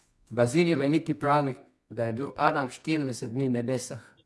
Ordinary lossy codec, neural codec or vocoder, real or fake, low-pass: none; codec, 24 kHz, 0.9 kbps, WavTokenizer, medium music audio release; fake; none